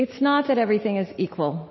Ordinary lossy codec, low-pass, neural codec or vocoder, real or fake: MP3, 24 kbps; 7.2 kHz; none; real